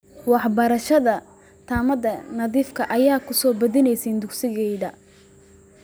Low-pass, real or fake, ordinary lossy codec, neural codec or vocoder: none; real; none; none